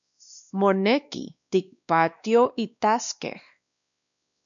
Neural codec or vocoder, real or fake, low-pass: codec, 16 kHz, 2 kbps, X-Codec, WavLM features, trained on Multilingual LibriSpeech; fake; 7.2 kHz